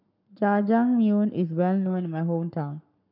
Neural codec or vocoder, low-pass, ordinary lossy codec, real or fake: codec, 44.1 kHz, 7.8 kbps, Pupu-Codec; 5.4 kHz; none; fake